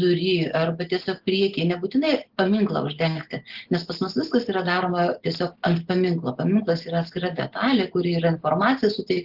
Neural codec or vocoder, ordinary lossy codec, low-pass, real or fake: none; Opus, 16 kbps; 5.4 kHz; real